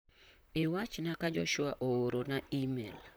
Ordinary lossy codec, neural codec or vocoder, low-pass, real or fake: none; vocoder, 44.1 kHz, 128 mel bands, Pupu-Vocoder; none; fake